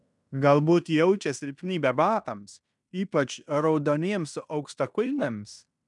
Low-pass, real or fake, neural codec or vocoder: 10.8 kHz; fake; codec, 16 kHz in and 24 kHz out, 0.9 kbps, LongCat-Audio-Codec, fine tuned four codebook decoder